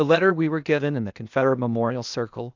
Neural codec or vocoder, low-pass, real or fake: codec, 16 kHz, 0.8 kbps, ZipCodec; 7.2 kHz; fake